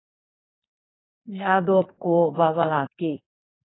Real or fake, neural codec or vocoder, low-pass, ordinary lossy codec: fake; codec, 44.1 kHz, 2.6 kbps, SNAC; 7.2 kHz; AAC, 16 kbps